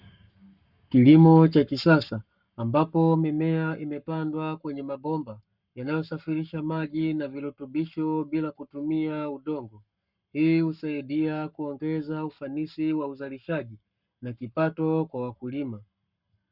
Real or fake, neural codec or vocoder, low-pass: fake; codec, 44.1 kHz, 7.8 kbps, Pupu-Codec; 5.4 kHz